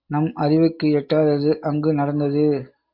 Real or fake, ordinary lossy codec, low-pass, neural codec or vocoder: real; MP3, 48 kbps; 5.4 kHz; none